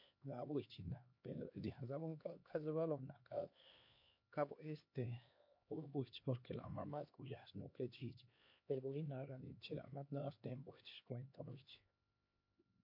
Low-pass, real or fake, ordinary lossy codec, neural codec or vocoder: 5.4 kHz; fake; MP3, 32 kbps; codec, 16 kHz, 2 kbps, X-Codec, HuBERT features, trained on LibriSpeech